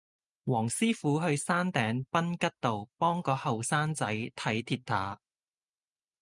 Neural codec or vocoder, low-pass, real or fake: none; 10.8 kHz; real